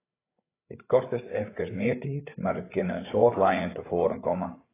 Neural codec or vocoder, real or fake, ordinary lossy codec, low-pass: codec, 16 kHz, 8 kbps, FreqCodec, larger model; fake; AAC, 16 kbps; 3.6 kHz